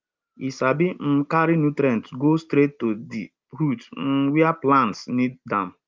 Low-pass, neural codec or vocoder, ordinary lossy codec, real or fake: 7.2 kHz; none; Opus, 24 kbps; real